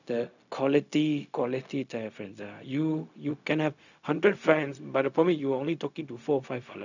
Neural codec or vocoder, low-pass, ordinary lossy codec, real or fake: codec, 16 kHz, 0.4 kbps, LongCat-Audio-Codec; 7.2 kHz; none; fake